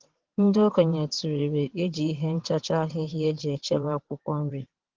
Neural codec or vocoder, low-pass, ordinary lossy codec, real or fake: vocoder, 22.05 kHz, 80 mel bands, WaveNeXt; 7.2 kHz; Opus, 16 kbps; fake